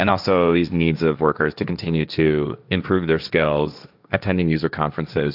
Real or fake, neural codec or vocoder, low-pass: fake; codec, 16 kHz, 1.1 kbps, Voila-Tokenizer; 5.4 kHz